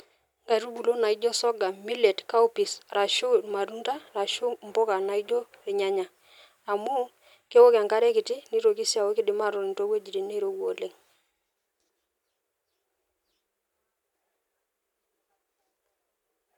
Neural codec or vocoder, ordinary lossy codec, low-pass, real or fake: none; none; 19.8 kHz; real